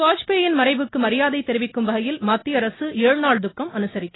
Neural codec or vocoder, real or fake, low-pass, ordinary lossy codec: none; real; 7.2 kHz; AAC, 16 kbps